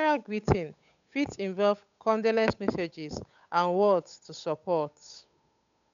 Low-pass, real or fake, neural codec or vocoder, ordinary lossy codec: 7.2 kHz; fake; codec, 16 kHz, 8 kbps, FunCodec, trained on Chinese and English, 25 frames a second; none